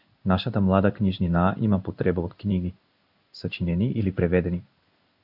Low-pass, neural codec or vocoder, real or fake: 5.4 kHz; codec, 16 kHz in and 24 kHz out, 1 kbps, XY-Tokenizer; fake